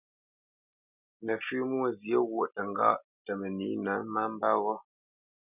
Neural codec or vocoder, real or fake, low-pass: none; real; 3.6 kHz